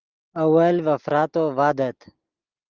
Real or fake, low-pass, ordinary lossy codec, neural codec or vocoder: real; 7.2 kHz; Opus, 32 kbps; none